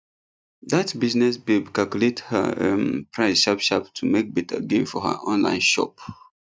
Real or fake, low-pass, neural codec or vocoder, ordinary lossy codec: real; none; none; none